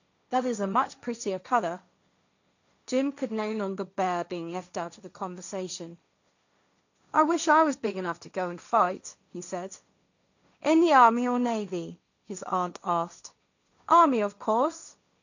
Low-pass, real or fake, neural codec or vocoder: 7.2 kHz; fake; codec, 16 kHz, 1.1 kbps, Voila-Tokenizer